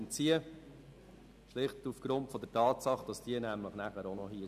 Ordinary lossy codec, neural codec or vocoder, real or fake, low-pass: none; none; real; 14.4 kHz